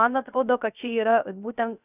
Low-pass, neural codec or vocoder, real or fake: 3.6 kHz; codec, 16 kHz, 0.3 kbps, FocalCodec; fake